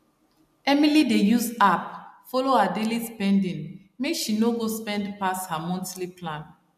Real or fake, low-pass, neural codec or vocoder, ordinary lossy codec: real; 14.4 kHz; none; MP3, 96 kbps